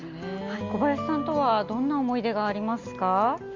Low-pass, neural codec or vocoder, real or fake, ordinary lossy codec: 7.2 kHz; none; real; Opus, 32 kbps